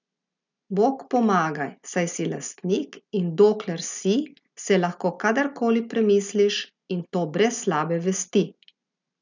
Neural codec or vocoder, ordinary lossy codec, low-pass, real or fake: none; none; 7.2 kHz; real